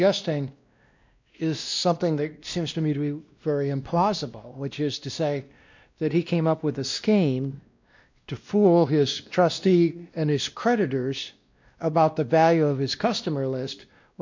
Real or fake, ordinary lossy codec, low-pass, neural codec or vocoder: fake; MP3, 64 kbps; 7.2 kHz; codec, 16 kHz, 1 kbps, X-Codec, WavLM features, trained on Multilingual LibriSpeech